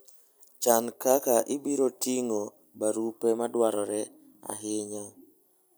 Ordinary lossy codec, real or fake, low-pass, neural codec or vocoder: none; real; none; none